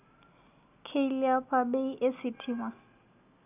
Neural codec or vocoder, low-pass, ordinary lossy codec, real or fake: none; 3.6 kHz; none; real